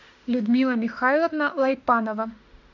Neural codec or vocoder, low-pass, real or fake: autoencoder, 48 kHz, 32 numbers a frame, DAC-VAE, trained on Japanese speech; 7.2 kHz; fake